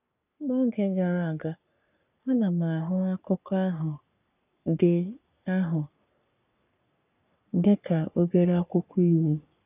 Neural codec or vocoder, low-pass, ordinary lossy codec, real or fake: codec, 44.1 kHz, 3.4 kbps, Pupu-Codec; 3.6 kHz; none; fake